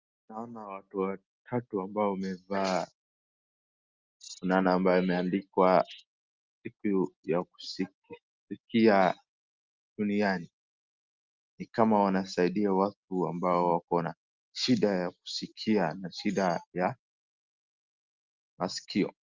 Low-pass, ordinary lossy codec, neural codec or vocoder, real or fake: 7.2 kHz; Opus, 32 kbps; none; real